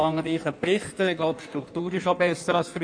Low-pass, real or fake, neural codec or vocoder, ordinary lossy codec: 9.9 kHz; fake; codec, 16 kHz in and 24 kHz out, 1.1 kbps, FireRedTTS-2 codec; MP3, 48 kbps